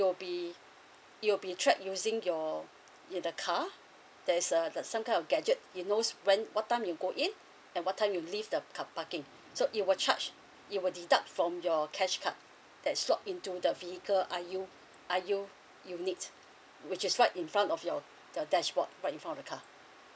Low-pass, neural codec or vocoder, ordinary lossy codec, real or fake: none; none; none; real